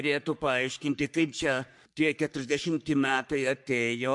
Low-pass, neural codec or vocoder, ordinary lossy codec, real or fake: 10.8 kHz; codec, 44.1 kHz, 3.4 kbps, Pupu-Codec; MP3, 64 kbps; fake